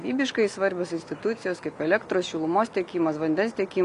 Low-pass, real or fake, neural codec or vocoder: 10.8 kHz; real; none